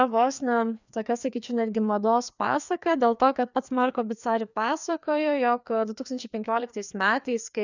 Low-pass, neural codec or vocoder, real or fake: 7.2 kHz; codec, 16 kHz, 2 kbps, FreqCodec, larger model; fake